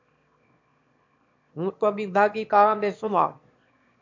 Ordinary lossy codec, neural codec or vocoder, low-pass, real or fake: MP3, 48 kbps; autoencoder, 22.05 kHz, a latent of 192 numbers a frame, VITS, trained on one speaker; 7.2 kHz; fake